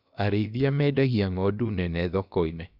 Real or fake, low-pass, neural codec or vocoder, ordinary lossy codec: fake; 5.4 kHz; codec, 16 kHz, about 1 kbps, DyCAST, with the encoder's durations; none